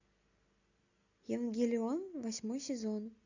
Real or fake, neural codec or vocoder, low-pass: real; none; 7.2 kHz